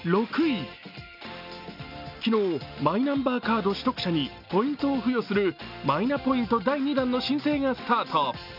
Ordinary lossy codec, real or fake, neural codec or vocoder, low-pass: none; real; none; 5.4 kHz